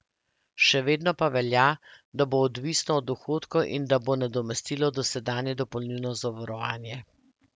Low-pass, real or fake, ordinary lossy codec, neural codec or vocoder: none; real; none; none